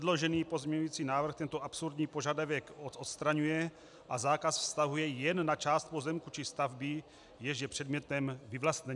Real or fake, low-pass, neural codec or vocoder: real; 10.8 kHz; none